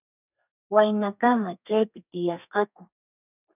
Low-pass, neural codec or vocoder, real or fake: 3.6 kHz; codec, 32 kHz, 1.9 kbps, SNAC; fake